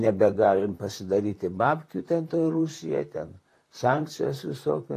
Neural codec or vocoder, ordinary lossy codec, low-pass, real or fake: vocoder, 44.1 kHz, 128 mel bands, Pupu-Vocoder; AAC, 48 kbps; 14.4 kHz; fake